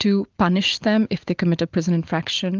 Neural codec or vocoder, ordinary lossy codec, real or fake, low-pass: none; Opus, 32 kbps; real; 7.2 kHz